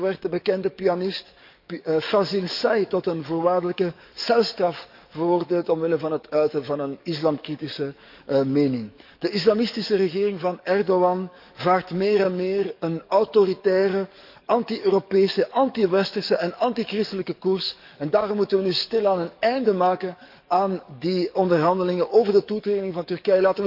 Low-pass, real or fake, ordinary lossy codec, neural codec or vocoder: 5.4 kHz; fake; none; codec, 44.1 kHz, 7.8 kbps, DAC